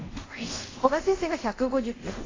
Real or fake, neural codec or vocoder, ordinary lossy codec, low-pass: fake; codec, 24 kHz, 0.5 kbps, DualCodec; AAC, 32 kbps; 7.2 kHz